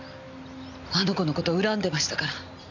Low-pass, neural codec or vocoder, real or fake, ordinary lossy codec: 7.2 kHz; none; real; none